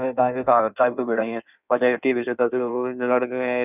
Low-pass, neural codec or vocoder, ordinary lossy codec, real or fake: 3.6 kHz; codec, 16 kHz in and 24 kHz out, 1.1 kbps, FireRedTTS-2 codec; none; fake